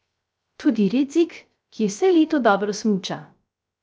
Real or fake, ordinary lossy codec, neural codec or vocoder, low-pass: fake; none; codec, 16 kHz, 0.3 kbps, FocalCodec; none